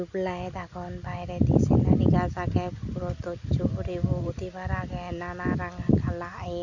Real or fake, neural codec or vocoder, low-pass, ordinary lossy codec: real; none; 7.2 kHz; none